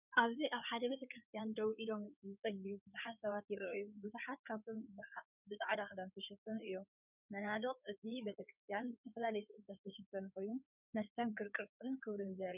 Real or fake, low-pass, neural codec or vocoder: fake; 3.6 kHz; codec, 16 kHz in and 24 kHz out, 2.2 kbps, FireRedTTS-2 codec